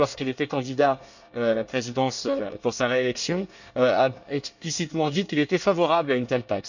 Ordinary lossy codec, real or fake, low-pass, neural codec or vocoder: none; fake; 7.2 kHz; codec, 24 kHz, 1 kbps, SNAC